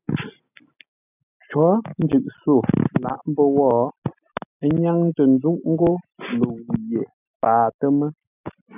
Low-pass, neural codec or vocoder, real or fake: 3.6 kHz; none; real